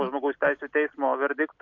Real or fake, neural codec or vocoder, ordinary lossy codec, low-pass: real; none; MP3, 48 kbps; 7.2 kHz